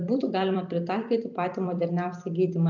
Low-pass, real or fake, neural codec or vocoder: 7.2 kHz; fake; vocoder, 44.1 kHz, 128 mel bands every 256 samples, BigVGAN v2